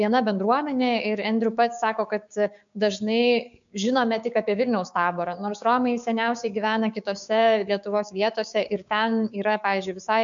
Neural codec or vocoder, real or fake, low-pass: codec, 16 kHz, 6 kbps, DAC; fake; 7.2 kHz